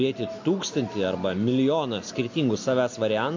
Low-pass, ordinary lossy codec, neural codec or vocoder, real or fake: 7.2 kHz; MP3, 48 kbps; none; real